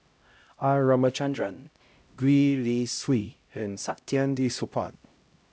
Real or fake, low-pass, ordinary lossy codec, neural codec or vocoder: fake; none; none; codec, 16 kHz, 0.5 kbps, X-Codec, HuBERT features, trained on LibriSpeech